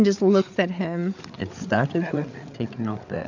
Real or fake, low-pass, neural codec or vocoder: fake; 7.2 kHz; codec, 16 kHz, 8 kbps, FreqCodec, larger model